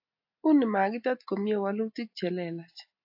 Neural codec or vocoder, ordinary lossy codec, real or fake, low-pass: none; AAC, 48 kbps; real; 5.4 kHz